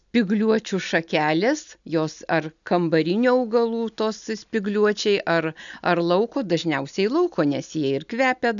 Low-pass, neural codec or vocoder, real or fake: 7.2 kHz; none; real